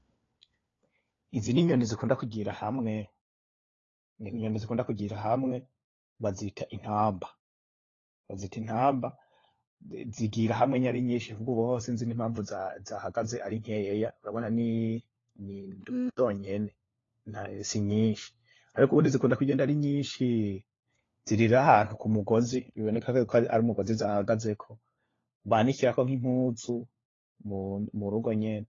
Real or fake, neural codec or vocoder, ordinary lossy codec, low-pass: fake; codec, 16 kHz, 4 kbps, FunCodec, trained on LibriTTS, 50 frames a second; AAC, 32 kbps; 7.2 kHz